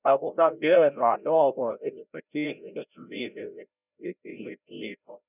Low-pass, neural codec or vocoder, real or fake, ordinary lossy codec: 3.6 kHz; codec, 16 kHz, 0.5 kbps, FreqCodec, larger model; fake; none